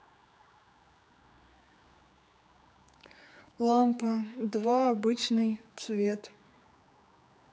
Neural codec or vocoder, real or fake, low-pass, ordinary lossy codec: codec, 16 kHz, 4 kbps, X-Codec, HuBERT features, trained on general audio; fake; none; none